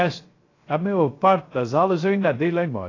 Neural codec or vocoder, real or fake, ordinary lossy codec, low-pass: codec, 16 kHz, 0.3 kbps, FocalCodec; fake; AAC, 32 kbps; 7.2 kHz